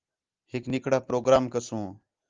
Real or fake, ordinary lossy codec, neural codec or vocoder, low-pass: real; Opus, 32 kbps; none; 7.2 kHz